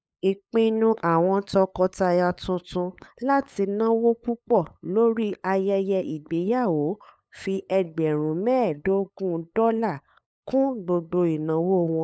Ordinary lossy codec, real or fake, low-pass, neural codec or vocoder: none; fake; none; codec, 16 kHz, 8 kbps, FunCodec, trained on LibriTTS, 25 frames a second